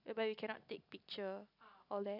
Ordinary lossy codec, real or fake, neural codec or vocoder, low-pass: none; real; none; 5.4 kHz